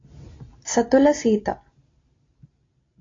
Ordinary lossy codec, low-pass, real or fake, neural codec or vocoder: AAC, 32 kbps; 7.2 kHz; real; none